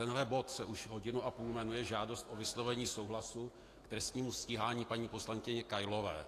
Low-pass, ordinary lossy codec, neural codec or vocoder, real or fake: 14.4 kHz; AAC, 48 kbps; autoencoder, 48 kHz, 128 numbers a frame, DAC-VAE, trained on Japanese speech; fake